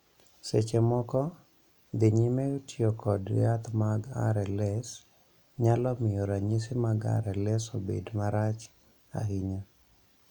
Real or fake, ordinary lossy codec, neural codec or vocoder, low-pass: real; none; none; 19.8 kHz